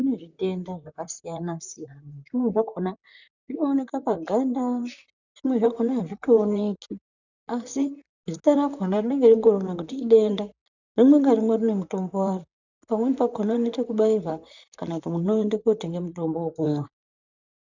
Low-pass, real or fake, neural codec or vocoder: 7.2 kHz; fake; vocoder, 44.1 kHz, 128 mel bands, Pupu-Vocoder